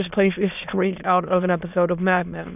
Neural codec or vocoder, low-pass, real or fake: autoencoder, 22.05 kHz, a latent of 192 numbers a frame, VITS, trained on many speakers; 3.6 kHz; fake